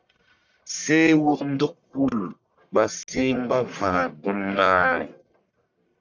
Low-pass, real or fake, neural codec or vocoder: 7.2 kHz; fake; codec, 44.1 kHz, 1.7 kbps, Pupu-Codec